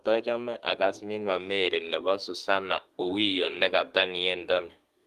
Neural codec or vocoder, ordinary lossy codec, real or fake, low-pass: codec, 32 kHz, 1.9 kbps, SNAC; Opus, 24 kbps; fake; 14.4 kHz